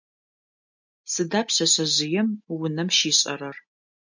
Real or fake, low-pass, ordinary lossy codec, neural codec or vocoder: real; 7.2 kHz; MP3, 48 kbps; none